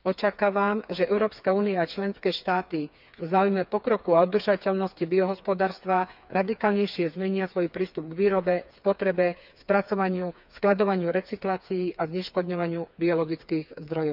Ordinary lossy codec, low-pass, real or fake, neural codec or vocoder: none; 5.4 kHz; fake; codec, 16 kHz, 4 kbps, FreqCodec, smaller model